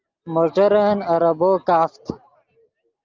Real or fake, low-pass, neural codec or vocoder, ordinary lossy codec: real; 7.2 kHz; none; Opus, 24 kbps